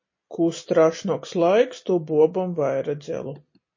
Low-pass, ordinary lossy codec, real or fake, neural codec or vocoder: 7.2 kHz; MP3, 32 kbps; real; none